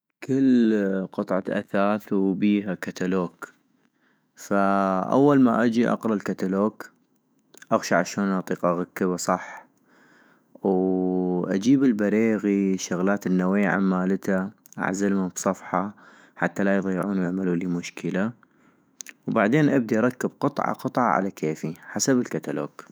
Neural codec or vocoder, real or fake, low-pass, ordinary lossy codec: autoencoder, 48 kHz, 128 numbers a frame, DAC-VAE, trained on Japanese speech; fake; none; none